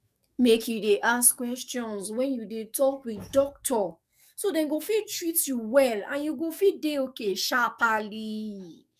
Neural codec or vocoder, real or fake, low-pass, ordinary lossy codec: codec, 44.1 kHz, 7.8 kbps, DAC; fake; 14.4 kHz; none